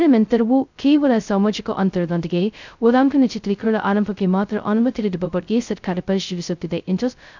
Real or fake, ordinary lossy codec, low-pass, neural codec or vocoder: fake; none; 7.2 kHz; codec, 16 kHz, 0.2 kbps, FocalCodec